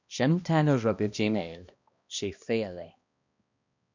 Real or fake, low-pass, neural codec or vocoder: fake; 7.2 kHz; codec, 16 kHz, 1 kbps, X-Codec, HuBERT features, trained on balanced general audio